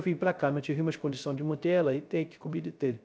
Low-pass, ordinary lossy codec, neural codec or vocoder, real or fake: none; none; codec, 16 kHz, 0.3 kbps, FocalCodec; fake